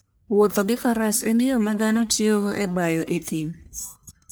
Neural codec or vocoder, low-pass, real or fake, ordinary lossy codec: codec, 44.1 kHz, 1.7 kbps, Pupu-Codec; none; fake; none